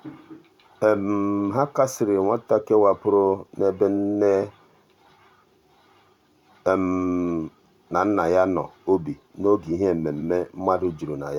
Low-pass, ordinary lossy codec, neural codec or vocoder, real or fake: 19.8 kHz; none; vocoder, 44.1 kHz, 128 mel bands every 512 samples, BigVGAN v2; fake